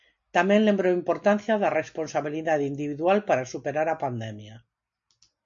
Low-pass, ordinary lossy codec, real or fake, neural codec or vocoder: 7.2 kHz; MP3, 48 kbps; real; none